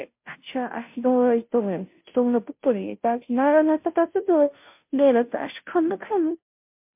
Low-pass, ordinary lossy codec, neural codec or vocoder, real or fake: 3.6 kHz; MP3, 32 kbps; codec, 16 kHz, 0.5 kbps, FunCodec, trained on Chinese and English, 25 frames a second; fake